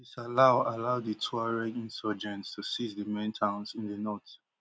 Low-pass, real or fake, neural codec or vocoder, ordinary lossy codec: none; real; none; none